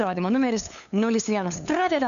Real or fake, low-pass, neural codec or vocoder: fake; 7.2 kHz; codec, 16 kHz, 2 kbps, FunCodec, trained on LibriTTS, 25 frames a second